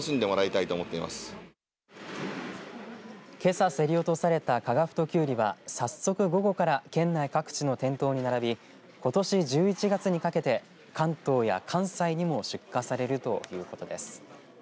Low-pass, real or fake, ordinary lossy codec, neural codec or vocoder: none; real; none; none